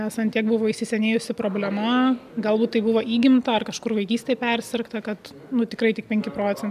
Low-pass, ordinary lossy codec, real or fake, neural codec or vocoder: 14.4 kHz; MP3, 96 kbps; real; none